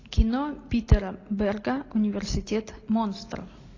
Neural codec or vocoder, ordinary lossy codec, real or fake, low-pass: none; AAC, 32 kbps; real; 7.2 kHz